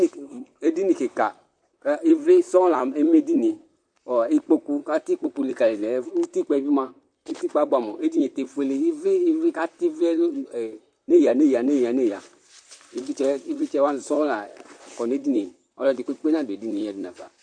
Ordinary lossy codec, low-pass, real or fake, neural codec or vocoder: MP3, 64 kbps; 9.9 kHz; fake; vocoder, 44.1 kHz, 128 mel bands, Pupu-Vocoder